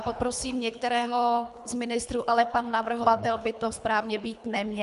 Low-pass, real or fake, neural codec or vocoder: 10.8 kHz; fake; codec, 24 kHz, 3 kbps, HILCodec